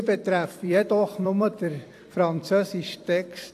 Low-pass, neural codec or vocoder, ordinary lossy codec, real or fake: 14.4 kHz; vocoder, 44.1 kHz, 128 mel bands every 256 samples, BigVGAN v2; AAC, 64 kbps; fake